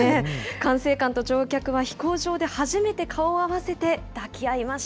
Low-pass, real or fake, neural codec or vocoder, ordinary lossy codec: none; real; none; none